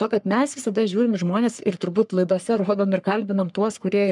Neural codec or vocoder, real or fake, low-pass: codec, 44.1 kHz, 3.4 kbps, Pupu-Codec; fake; 10.8 kHz